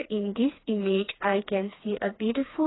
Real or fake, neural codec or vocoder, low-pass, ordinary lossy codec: fake; codec, 16 kHz, 2 kbps, FreqCodec, smaller model; 7.2 kHz; AAC, 16 kbps